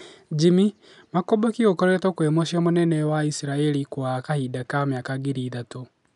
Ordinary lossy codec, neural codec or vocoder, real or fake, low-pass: none; none; real; 10.8 kHz